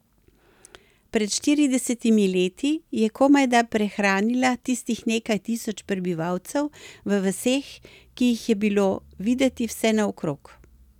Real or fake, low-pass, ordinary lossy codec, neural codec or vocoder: real; 19.8 kHz; none; none